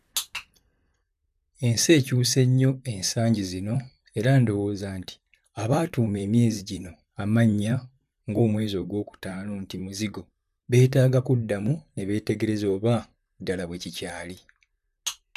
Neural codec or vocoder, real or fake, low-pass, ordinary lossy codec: vocoder, 44.1 kHz, 128 mel bands, Pupu-Vocoder; fake; 14.4 kHz; none